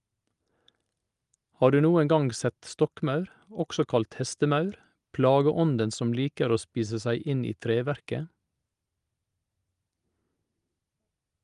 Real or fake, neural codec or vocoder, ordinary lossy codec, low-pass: real; none; Opus, 32 kbps; 10.8 kHz